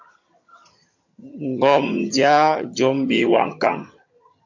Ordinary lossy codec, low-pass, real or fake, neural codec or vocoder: MP3, 48 kbps; 7.2 kHz; fake; vocoder, 22.05 kHz, 80 mel bands, HiFi-GAN